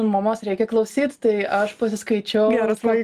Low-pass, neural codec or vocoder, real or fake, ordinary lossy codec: 14.4 kHz; none; real; Opus, 32 kbps